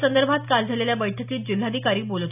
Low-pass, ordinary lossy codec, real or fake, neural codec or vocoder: 3.6 kHz; none; real; none